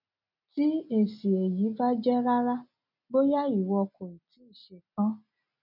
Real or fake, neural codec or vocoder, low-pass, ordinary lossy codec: real; none; 5.4 kHz; none